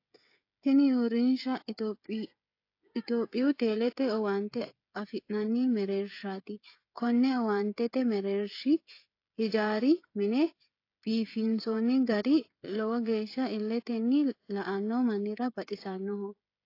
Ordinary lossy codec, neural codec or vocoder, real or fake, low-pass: AAC, 32 kbps; codec, 16 kHz, 16 kbps, FreqCodec, smaller model; fake; 5.4 kHz